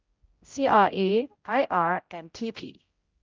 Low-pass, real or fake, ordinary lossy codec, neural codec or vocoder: 7.2 kHz; fake; Opus, 24 kbps; codec, 16 kHz, 0.5 kbps, X-Codec, HuBERT features, trained on general audio